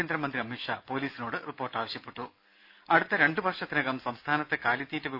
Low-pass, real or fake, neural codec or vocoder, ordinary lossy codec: 5.4 kHz; real; none; none